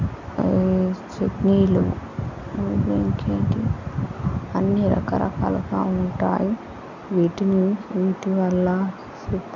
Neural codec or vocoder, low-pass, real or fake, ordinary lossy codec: none; 7.2 kHz; real; none